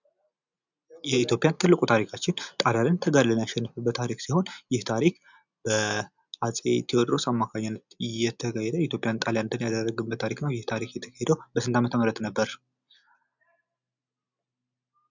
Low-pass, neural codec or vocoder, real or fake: 7.2 kHz; none; real